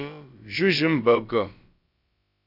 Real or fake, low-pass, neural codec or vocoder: fake; 5.4 kHz; codec, 16 kHz, about 1 kbps, DyCAST, with the encoder's durations